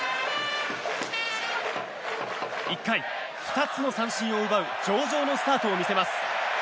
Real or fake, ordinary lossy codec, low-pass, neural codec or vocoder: real; none; none; none